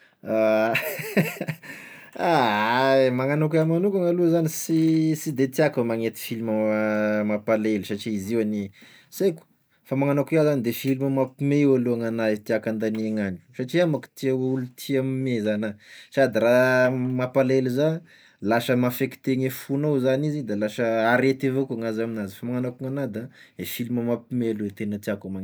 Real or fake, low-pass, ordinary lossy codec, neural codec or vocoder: real; none; none; none